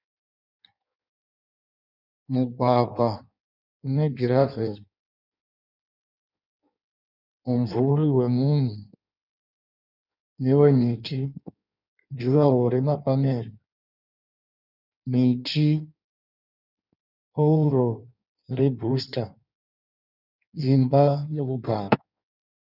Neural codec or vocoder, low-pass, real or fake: codec, 16 kHz in and 24 kHz out, 1.1 kbps, FireRedTTS-2 codec; 5.4 kHz; fake